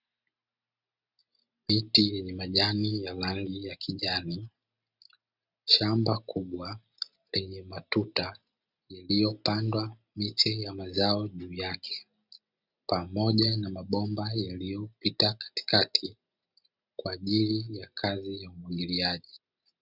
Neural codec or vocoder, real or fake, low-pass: none; real; 5.4 kHz